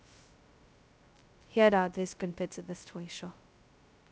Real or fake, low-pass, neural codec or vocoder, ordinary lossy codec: fake; none; codec, 16 kHz, 0.2 kbps, FocalCodec; none